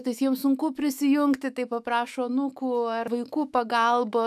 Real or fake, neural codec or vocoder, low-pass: fake; autoencoder, 48 kHz, 128 numbers a frame, DAC-VAE, trained on Japanese speech; 14.4 kHz